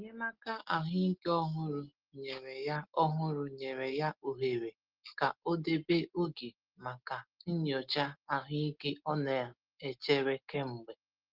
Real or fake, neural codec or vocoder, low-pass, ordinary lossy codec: real; none; 5.4 kHz; Opus, 16 kbps